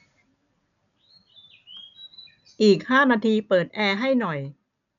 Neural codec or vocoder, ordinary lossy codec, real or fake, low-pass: none; none; real; 7.2 kHz